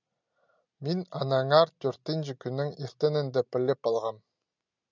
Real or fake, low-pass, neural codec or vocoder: real; 7.2 kHz; none